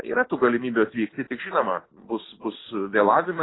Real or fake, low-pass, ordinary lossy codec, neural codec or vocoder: real; 7.2 kHz; AAC, 16 kbps; none